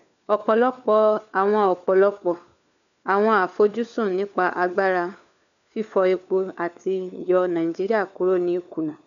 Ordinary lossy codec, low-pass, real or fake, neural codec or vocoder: none; 7.2 kHz; fake; codec, 16 kHz, 4 kbps, FunCodec, trained on LibriTTS, 50 frames a second